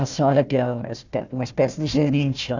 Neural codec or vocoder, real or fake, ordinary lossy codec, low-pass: codec, 16 kHz, 1 kbps, FunCodec, trained on Chinese and English, 50 frames a second; fake; none; 7.2 kHz